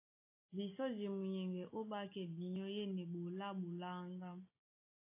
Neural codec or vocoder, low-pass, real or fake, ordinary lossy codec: none; 3.6 kHz; real; AAC, 32 kbps